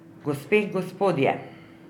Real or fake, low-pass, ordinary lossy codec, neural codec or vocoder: real; 19.8 kHz; none; none